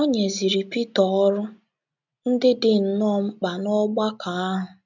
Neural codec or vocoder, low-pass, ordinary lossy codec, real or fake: none; 7.2 kHz; none; real